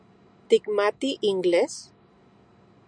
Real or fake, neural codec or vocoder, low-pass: real; none; 9.9 kHz